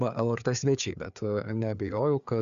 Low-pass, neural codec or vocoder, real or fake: 7.2 kHz; codec, 16 kHz, 4 kbps, FreqCodec, larger model; fake